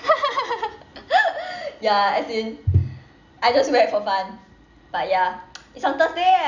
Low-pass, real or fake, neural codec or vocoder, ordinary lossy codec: 7.2 kHz; real; none; none